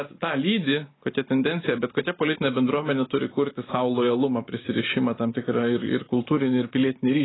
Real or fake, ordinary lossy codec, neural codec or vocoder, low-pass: real; AAC, 16 kbps; none; 7.2 kHz